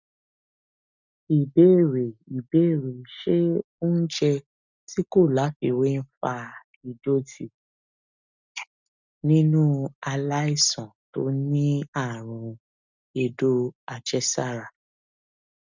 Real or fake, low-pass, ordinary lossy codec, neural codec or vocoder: real; 7.2 kHz; none; none